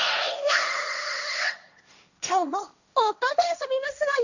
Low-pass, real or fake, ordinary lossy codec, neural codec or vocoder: 7.2 kHz; fake; none; codec, 16 kHz, 1.1 kbps, Voila-Tokenizer